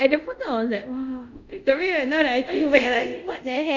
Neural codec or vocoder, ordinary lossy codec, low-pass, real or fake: codec, 24 kHz, 0.5 kbps, DualCodec; none; 7.2 kHz; fake